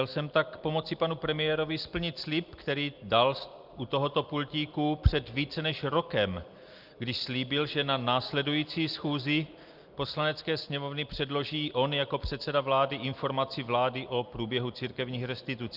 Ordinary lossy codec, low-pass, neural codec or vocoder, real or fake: Opus, 24 kbps; 5.4 kHz; none; real